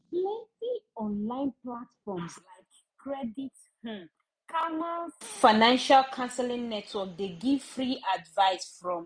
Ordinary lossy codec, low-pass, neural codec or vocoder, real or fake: none; 9.9 kHz; none; real